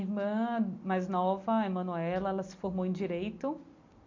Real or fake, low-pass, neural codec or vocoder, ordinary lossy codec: real; 7.2 kHz; none; MP3, 64 kbps